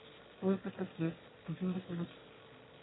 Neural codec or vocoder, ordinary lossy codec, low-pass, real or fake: autoencoder, 22.05 kHz, a latent of 192 numbers a frame, VITS, trained on one speaker; AAC, 16 kbps; 7.2 kHz; fake